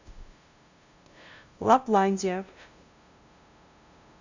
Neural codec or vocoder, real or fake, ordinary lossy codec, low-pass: codec, 16 kHz, 0.5 kbps, FunCodec, trained on LibriTTS, 25 frames a second; fake; Opus, 64 kbps; 7.2 kHz